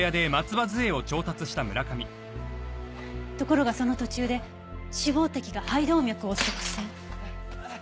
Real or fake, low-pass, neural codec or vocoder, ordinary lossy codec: real; none; none; none